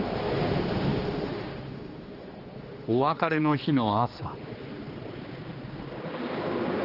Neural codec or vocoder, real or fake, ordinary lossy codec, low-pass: codec, 16 kHz, 2 kbps, X-Codec, HuBERT features, trained on general audio; fake; Opus, 24 kbps; 5.4 kHz